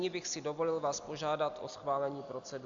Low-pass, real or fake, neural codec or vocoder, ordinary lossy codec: 7.2 kHz; real; none; AAC, 64 kbps